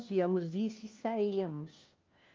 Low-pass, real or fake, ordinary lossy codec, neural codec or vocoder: 7.2 kHz; fake; Opus, 32 kbps; codec, 16 kHz, 1 kbps, FunCodec, trained on LibriTTS, 50 frames a second